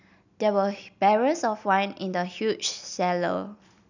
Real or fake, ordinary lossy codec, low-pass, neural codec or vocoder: real; none; 7.2 kHz; none